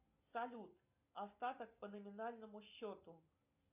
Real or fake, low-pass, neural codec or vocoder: real; 3.6 kHz; none